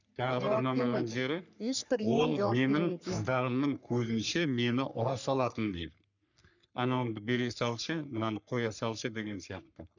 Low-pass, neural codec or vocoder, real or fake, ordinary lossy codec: 7.2 kHz; codec, 44.1 kHz, 3.4 kbps, Pupu-Codec; fake; none